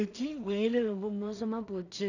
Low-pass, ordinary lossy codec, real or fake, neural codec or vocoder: 7.2 kHz; none; fake; codec, 16 kHz in and 24 kHz out, 0.4 kbps, LongCat-Audio-Codec, two codebook decoder